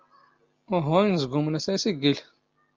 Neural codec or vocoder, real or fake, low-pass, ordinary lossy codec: none; real; 7.2 kHz; Opus, 32 kbps